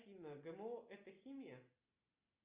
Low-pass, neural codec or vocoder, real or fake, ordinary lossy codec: 3.6 kHz; none; real; Opus, 64 kbps